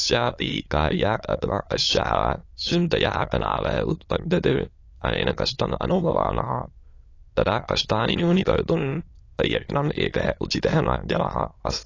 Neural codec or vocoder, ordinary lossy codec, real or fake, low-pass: autoencoder, 22.05 kHz, a latent of 192 numbers a frame, VITS, trained on many speakers; AAC, 32 kbps; fake; 7.2 kHz